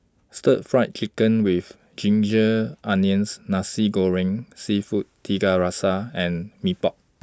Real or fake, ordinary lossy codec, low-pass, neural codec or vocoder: real; none; none; none